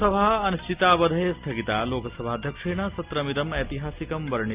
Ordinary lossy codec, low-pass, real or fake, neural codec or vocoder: Opus, 24 kbps; 3.6 kHz; real; none